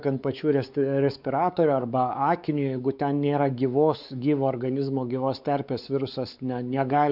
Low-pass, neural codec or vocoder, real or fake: 5.4 kHz; codec, 16 kHz, 4 kbps, X-Codec, WavLM features, trained on Multilingual LibriSpeech; fake